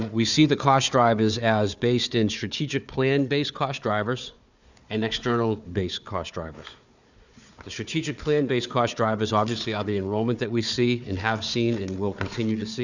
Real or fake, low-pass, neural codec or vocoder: fake; 7.2 kHz; codec, 16 kHz, 4 kbps, FunCodec, trained on Chinese and English, 50 frames a second